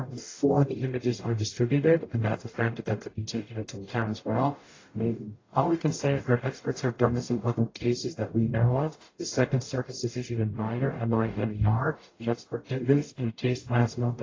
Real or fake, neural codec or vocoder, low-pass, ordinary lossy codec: fake; codec, 44.1 kHz, 0.9 kbps, DAC; 7.2 kHz; AAC, 32 kbps